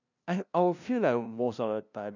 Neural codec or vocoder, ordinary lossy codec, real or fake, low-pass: codec, 16 kHz, 0.5 kbps, FunCodec, trained on LibriTTS, 25 frames a second; none; fake; 7.2 kHz